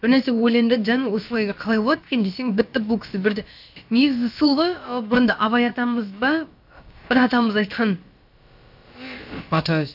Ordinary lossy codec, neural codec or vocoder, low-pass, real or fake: AAC, 48 kbps; codec, 16 kHz, about 1 kbps, DyCAST, with the encoder's durations; 5.4 kHz; fake